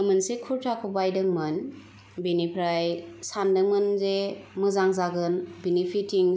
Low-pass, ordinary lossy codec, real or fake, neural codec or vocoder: none; none; real; none